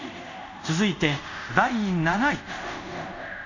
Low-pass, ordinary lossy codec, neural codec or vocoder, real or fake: 7.2 kHz; none; codec, 24 kHz, 0.5 kbps, DualCodec; fake